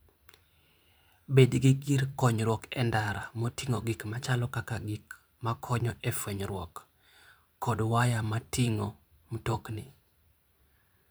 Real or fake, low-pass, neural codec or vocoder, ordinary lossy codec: fake; none; vocoder, 44.1 kHz, 128 mel bands every 512 samples, BigVGAN v2; none